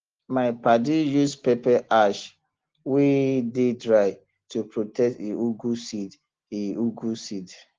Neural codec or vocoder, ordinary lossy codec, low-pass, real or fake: none; Opus, 16 kbps; 7.2 kHz; real